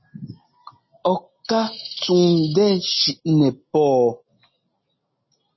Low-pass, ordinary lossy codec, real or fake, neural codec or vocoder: 7.2 kHz; MP3, 24 kbps; real; none